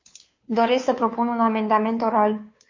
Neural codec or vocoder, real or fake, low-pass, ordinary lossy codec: codec, 16 kHz, 2 kbps, FunCodec, trained on Chinese and English, 25 frames a second; fake; 7.2 kHz; MP3, 48 kbps